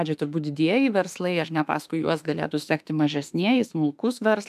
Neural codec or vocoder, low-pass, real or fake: autoencoder, 48 kHz, 32 numbers a frame, DAC-VAE, trained on Japanese speech; 14.4 kHz; fake